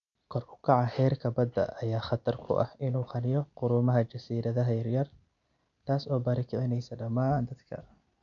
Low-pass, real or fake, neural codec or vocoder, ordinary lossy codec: 7.2 kHz; real; none; none